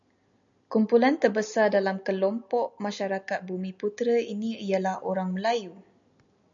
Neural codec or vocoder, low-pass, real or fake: none; 7.2 kHz; real